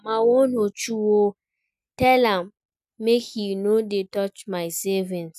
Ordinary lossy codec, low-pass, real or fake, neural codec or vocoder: none; 14.4 kHz; real; none